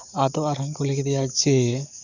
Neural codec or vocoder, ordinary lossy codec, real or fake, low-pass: codec, 44.1 kHz, 7.8 kbps, DAC; none; fake; 7.2 kHz